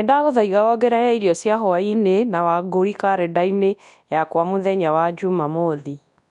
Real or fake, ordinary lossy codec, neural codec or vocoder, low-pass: fake; none; codec, 24 kHz, 0.9 kbps, WavTokenizer, large speech release; 10.8 kHz